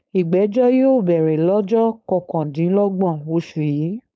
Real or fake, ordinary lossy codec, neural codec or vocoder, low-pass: fake; none; codec, 16 kHz, 4.8 kbps, FACodec; none